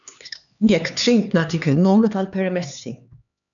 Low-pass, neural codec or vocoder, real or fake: 7.2 kHz; codec, 16 kHz, 2 kbps, X-Codec, HuBERT features, trained on LibriSpeech; fake